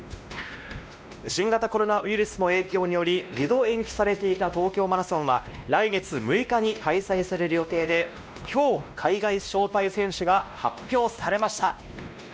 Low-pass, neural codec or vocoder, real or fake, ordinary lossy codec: none; codec, 16 kHz, 1 kbps, X-Codec, WavLM features, trained on Multilingual LibriSpeech; fake; none